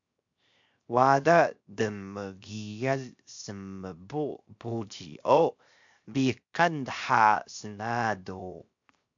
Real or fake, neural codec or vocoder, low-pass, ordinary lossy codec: fake; codec, 16 kHz, 0.7 kbps, FocalCodec; 7.2 kHz; MP3, 64 kbps